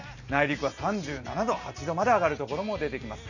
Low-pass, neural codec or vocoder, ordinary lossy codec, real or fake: 7.2 kHz; none; none; real